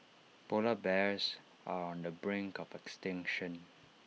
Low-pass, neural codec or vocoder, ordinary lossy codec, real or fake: none; none; none; real